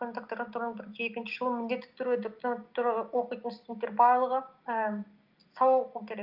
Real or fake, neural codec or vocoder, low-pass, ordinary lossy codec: real; none; 5.4 kHz; Opus, 24 kbps